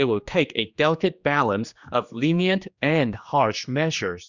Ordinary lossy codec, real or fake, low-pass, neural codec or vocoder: Opus, 64 kbps; fake; 7.2 kHz; codec, 16 kHz, 2 kbps, X-Codec, HuBERT features, trained on general audio